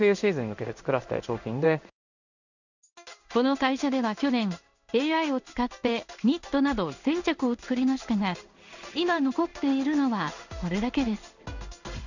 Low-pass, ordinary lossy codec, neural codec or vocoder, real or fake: 7.2 kHz; none; codec, 16 kHz in and 24 kHz out, 1 kbps, XY-Tokenizer; fake